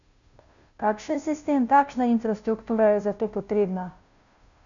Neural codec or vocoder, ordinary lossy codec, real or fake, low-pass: codec, 16 kHz, 0.5 kbps, FunCodec, trained on Chinese and English, 25 frames a second; none; fake; 7.2 kHz